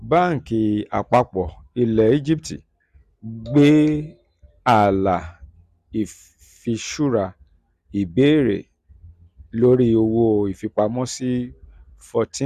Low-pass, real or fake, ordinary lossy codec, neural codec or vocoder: 14.4 kHz; real; Opus, 64 kbps; none